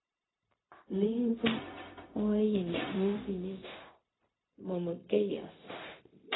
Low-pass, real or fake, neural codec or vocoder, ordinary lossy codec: 7.2 kHz; fake; codec, 16 kHz, 0.4 kbps, LongCat-Audio-Codec; AAC, 16 kbps